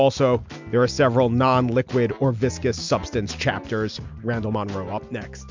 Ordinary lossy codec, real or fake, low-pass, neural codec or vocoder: MP3, 64 kbps; real; 7.2 kHz; none